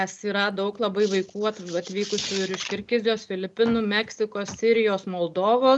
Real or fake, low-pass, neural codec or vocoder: real; 10.8 kHz; none